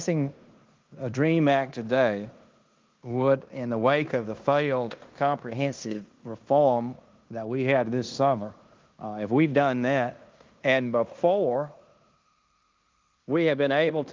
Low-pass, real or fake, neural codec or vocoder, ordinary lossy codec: 7.2 kHz; fake; codec, 16 kHz in and 24 kHz out, 0.9 kbps, LongCat-Audio-Codec, fine tuned four codebook decoder; Opus, 32 kbps